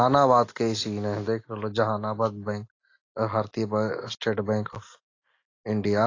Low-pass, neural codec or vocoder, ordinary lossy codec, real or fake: 7.2 kHz; none; AAC, 32 kbps; real